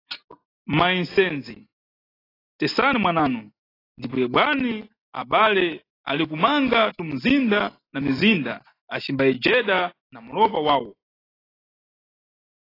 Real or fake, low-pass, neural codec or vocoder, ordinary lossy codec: real; 5.4 kHz; none; AAC, 24 kbps